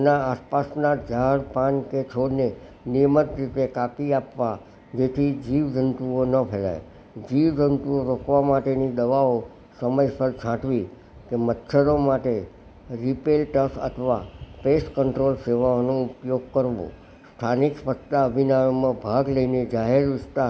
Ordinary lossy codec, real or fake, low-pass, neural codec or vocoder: none; real; none; none